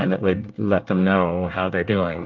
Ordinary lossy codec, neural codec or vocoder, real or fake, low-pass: Opus, 32 kbps; codec, 24 kHz, 1 kbps, SNAC; fake; 7.2 kHz